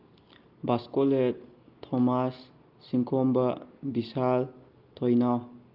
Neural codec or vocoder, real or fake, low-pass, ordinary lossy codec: none; real; 5.4 kHz; Opus, 32 kbps